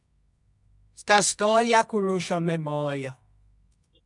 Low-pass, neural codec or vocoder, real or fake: 10.8 kHz; codec, 24 kHz, 0.9 kbps, WavTokenizer, medium music audio release; fake